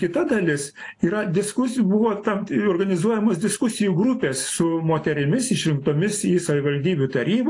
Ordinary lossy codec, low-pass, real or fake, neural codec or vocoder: AAC, 48 kbps; 10.8 kHz; real; none